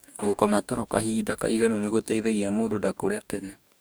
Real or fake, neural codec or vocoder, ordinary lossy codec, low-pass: fake; codec, 44.1 kHz, 2.6 kbps, DAC; none; none